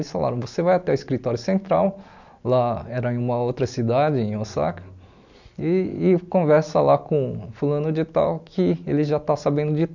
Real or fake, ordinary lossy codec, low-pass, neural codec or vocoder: real; none; 7.2 kHz; none